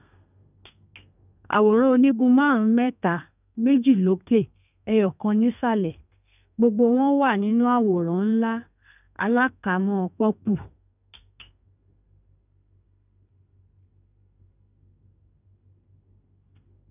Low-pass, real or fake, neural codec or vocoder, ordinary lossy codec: 3.6 kHz; fake; codec, 32 kHz, 1.9 kbps, SNAC; none